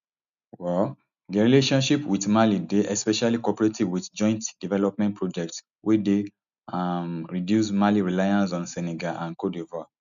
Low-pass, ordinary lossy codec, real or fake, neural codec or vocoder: 7.2 kHz; none; real; none